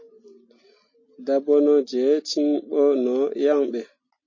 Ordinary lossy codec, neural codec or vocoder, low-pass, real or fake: MP3, 32 kbps; none; 7.2 kHz; real